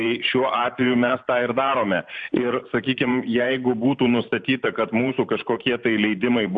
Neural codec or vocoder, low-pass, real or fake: vocoder, 44.1 kHz, 128 mel bands every 512 samples, BigVGAN v2; 9.9 kHz; fake